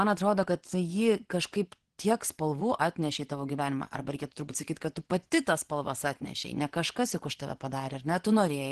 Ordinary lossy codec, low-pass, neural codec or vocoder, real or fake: Opus, 16 kbps; 10.8 kHz; vocoder, 24 kHz, 100 mel bands, Vocos; fake